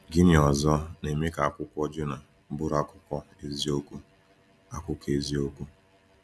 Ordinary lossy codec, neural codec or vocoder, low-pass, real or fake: none; none; none; real